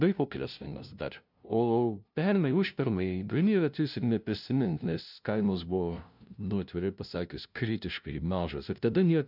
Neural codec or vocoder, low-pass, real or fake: codec, 16 kHz, 0.5 kbps, FunCodec, trained on LibriTTS, 25 frames a second; 5.4 kHz; fake